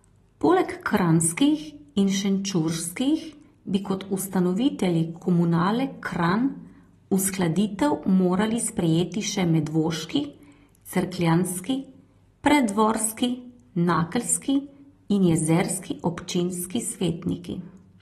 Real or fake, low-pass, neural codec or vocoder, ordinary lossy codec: real; 19.8 kHz; none; AAC, 32 kbps